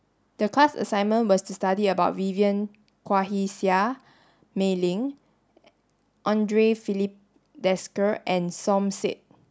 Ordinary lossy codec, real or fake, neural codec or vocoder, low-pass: none; real; none; none